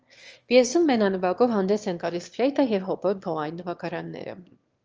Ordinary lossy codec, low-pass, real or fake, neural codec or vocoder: Opus, 24 kbps; 7.2 kHz; fake; autoencoder, 22.05 kHz, a latent of 192 numbers a frame, VITS, trained on one speaker